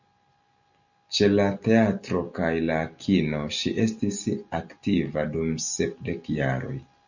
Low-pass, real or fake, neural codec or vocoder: 7.2 kHz; real; none